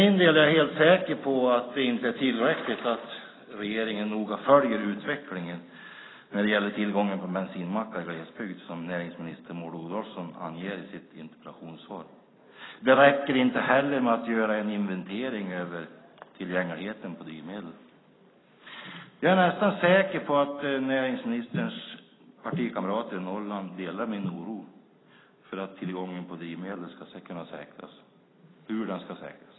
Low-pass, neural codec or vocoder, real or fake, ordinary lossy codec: 7.2 kHz; none; real; AAC, 16 kbps